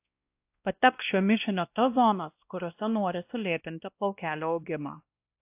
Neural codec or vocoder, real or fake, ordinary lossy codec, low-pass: codec, 16 kHz, 1 kbps, X-Codec, WavLM features, trained on Multilingual LibriSpeech; fake; AAC, 32 kbps; 3.6 kHz